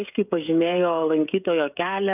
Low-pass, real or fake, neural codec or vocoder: 3.6 kHz; fake; codec, 16 kHz, 16 kbps, FreqCodec, smaller model